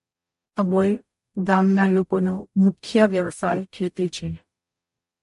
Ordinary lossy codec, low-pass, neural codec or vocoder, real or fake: MP3, 48 kbps; 14.4 kHz; codec, 44.1 kHz, 0.9 kbps, DAC; fake